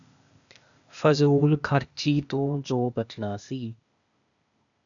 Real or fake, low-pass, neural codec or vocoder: fake; 7.2 kHz; codec, 16 kHz, 0.8 kbps, ZipCodec